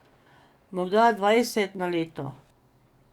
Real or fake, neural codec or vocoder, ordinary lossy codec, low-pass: fake; codec, 44.1 kHz, 7.8 kbps, DAC; Opus, 64 kbps; 19.8 kHz